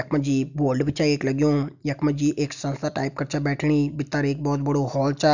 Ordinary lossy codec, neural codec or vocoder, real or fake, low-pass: none; none; real; 7.2 kHz